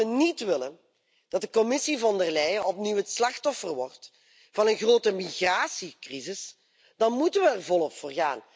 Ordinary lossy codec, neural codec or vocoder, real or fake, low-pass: none; none; real; none